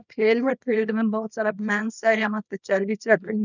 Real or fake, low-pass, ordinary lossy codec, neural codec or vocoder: fake; 7.2 kHz; none; codec, 24 kHz, 0.9 kbps, WavTokenizer, small release